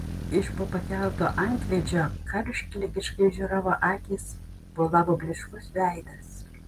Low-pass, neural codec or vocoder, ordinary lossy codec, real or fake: 14.4 kHz; none; Opus, 32 kbps; real